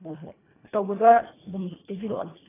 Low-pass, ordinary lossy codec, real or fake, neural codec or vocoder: 3.6 kHz; AAC, 16 kbps; fake; codec, 24 kHz, 1.5 kbps, HILCodec